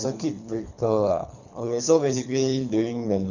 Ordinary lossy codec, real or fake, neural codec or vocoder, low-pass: none; fake; codec, 24 kHz, 3 kbps, HILCodec; 7.2 kHz